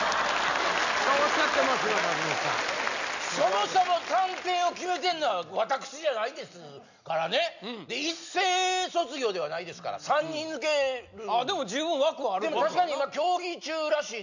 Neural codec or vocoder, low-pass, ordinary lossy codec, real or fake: none; 7.2 kHz; none; real